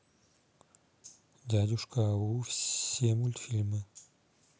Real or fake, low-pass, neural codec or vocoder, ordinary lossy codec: real; none; none; none